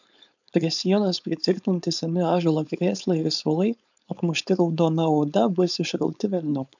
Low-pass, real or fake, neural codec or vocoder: 7.2 kHz; fake; codec, 16 kHz, 4.8 kbps, FACodec